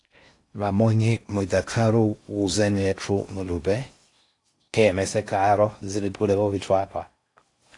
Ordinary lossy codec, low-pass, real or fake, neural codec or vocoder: AAC, 48 kbps; 10.8 kHz; fake; codec, 16 kHz in and 24 kHz out, 0.6 kbps, FocalCodec, streaming, 4096 codes